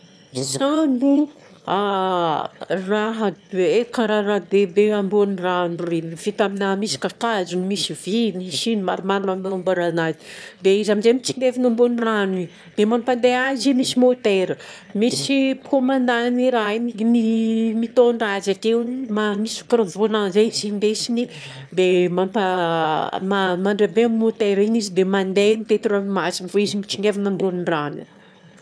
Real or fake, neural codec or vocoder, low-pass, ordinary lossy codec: fake; autoencoder, 22.05 kHz, a latent of 192 numbers a frame, VITS, trained on one speaker; none; none